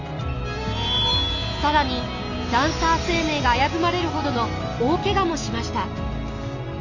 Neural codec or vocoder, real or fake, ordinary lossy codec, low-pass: none; real; none; 7.2 kHz